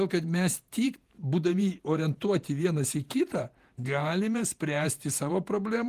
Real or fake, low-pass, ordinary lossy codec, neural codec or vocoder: fake; 14.4 kHz; Opus, 24 kbps; vocoder, 48 kHz, 128 mel bands, Vocos